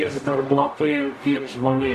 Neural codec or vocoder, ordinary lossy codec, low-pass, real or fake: codec, 44.1 kHz, 0.9 kbps, DAC; AAC, 96 kbps; 14.4 kHz; fake